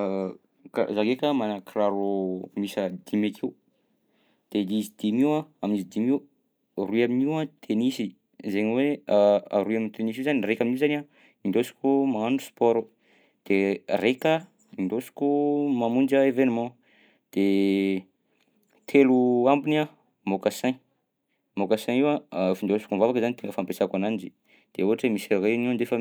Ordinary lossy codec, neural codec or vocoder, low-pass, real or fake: none; none; none; real